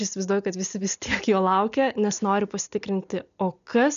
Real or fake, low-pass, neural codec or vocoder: real; 7.2 kHz; none